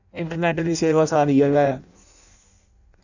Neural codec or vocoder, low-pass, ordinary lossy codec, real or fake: codec, 16 kHz in and 24 kHz out, 0.6 kbps, FireRedTTS-2 codec; 7.2 kHz; none; fake